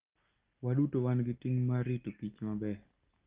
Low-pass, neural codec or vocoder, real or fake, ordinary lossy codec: 3.6 kHz; none; real; Opus, 32 kbps